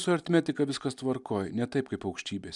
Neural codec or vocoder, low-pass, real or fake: none; 10.8 kHz; real